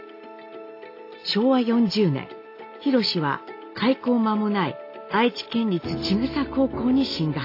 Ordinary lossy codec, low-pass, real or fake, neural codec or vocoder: AAC, 32 kbps; 5.4 kHz; real; none